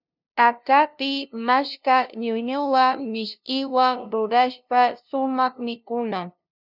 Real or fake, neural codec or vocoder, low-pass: fake; codec, 16 kHz, 0.5 kbps, FunCodec, trained on LibriTTS, 25 frames a second; 5.4 kHz